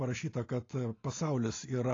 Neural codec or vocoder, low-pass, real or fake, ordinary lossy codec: none; 7.2 kHz; real; AAC, 32 kbps